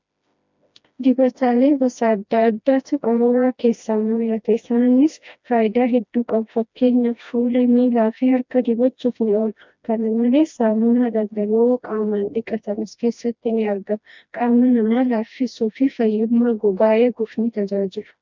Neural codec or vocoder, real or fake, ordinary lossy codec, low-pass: codec, 16 kHz, 1 kbps, FreqCodec, smaller model; fake; MP3, 64 kbps; 7.2 kHz